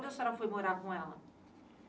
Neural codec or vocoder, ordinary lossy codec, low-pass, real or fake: none; none; none; real